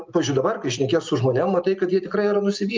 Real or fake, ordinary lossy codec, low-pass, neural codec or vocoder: real; Opus, 24 kbps; 7.2 kHz; none